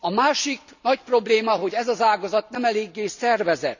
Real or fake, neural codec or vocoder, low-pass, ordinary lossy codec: real; none; 7.2 kHz; none